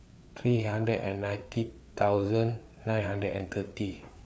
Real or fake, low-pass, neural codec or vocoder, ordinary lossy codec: fake; none; codec, 16 kHz, 4 kbps, FreqCodec, larger model; none